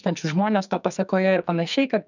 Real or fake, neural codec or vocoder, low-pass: fake; codec, 44.1 kHz, 2.6 kbps, SNAC; 7.2 kHz